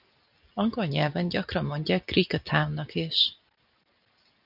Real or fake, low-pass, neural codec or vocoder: real; 5.4 kHz; none